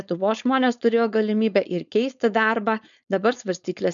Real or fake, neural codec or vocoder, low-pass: fake; codec, 16 kHz, 4.8 kbps, FACodec; 7.2 kHz